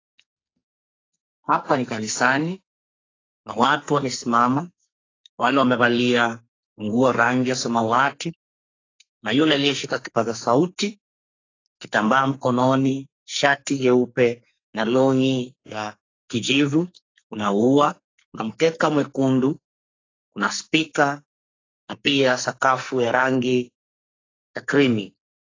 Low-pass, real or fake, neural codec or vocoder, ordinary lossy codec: 7.2 kHz; fake; codec, 44.1 kHz, 2.6 kbps, SNAC; AAC, 32 kbps